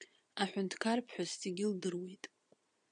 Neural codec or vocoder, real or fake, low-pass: vocoder, 22.05 kHz, 80 mel bands, Vocos; fake; 9.9 kHz